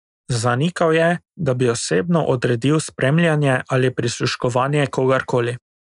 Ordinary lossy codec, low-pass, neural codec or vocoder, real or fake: none; 10.8 kHz; none; real